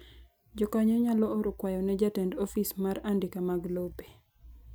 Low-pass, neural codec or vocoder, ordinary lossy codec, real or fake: none; none; none; real